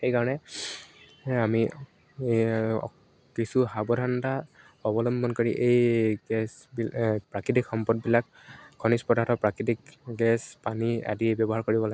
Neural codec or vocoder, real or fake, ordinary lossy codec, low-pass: none; real; none; none